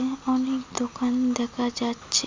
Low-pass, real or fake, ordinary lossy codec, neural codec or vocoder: 7.2 kHz; real; MP3, 64 kbps; none